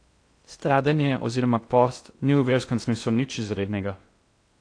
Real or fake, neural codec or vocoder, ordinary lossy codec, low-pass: fake; codec, 16 kHz in and 24 kHz out, 0.6 kbps, FocalCodec, streaming, 2048 codes; AAC, 48 kbps; 9.9 kHz